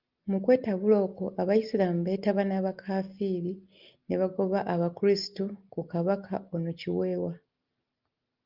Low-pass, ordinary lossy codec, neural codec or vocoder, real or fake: 5.4 kHz; Opus, 24 kbps; none; real